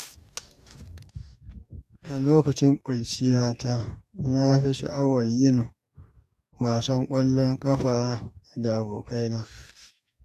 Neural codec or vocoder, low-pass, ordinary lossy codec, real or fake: codec, 44.1 kHz, 2.6 kbps, DAC; 14.4 kHz; AAC, 96 kbps; fake